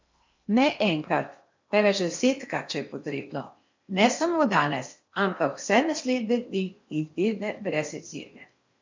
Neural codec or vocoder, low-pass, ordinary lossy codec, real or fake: codec, 16 kHz in and 24 kHz out, 0.8 kbps, FocalCodec, streaming, 65536 codes; 7.2 kHz; MP3, 64 kbps; fake